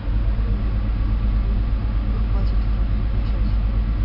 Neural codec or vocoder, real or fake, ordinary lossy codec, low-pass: none; real; AAC, 48 kbps; 5.4 kHz